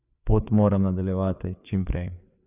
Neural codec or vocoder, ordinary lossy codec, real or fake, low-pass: codec, 16 kHz, 4 kbps, FreqCodec, larger model; none; fake; 3.6 kHz